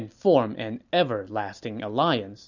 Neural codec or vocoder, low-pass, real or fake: none; 7.2 kHz; real